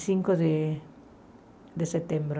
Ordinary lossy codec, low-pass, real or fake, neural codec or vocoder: none; none; real; none